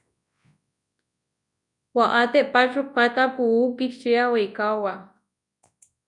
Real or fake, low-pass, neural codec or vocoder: fake; 10.8 kHz; codec, 24 kHz, 0.9 kbps, WavTokenizer, large speech release